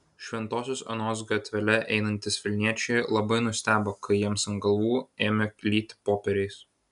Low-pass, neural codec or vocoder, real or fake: 10.8 kHz; none; real